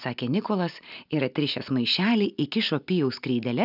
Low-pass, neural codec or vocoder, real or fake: 5.4 kHz; none; real